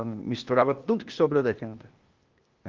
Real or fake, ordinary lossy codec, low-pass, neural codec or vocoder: fake; Opus, 32 kbps; 7.2 kHz; codec, 16 kHz, 0.8 kbps, ZipCodec